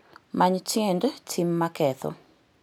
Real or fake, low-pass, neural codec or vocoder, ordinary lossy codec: real; none; none; none